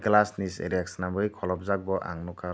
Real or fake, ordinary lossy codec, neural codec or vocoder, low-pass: real; none; none; none